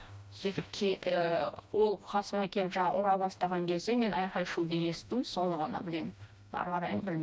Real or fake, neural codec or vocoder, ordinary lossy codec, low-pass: fake; codec, 16 kHz, 1 kbps, FreqCodec, smaller model; none; none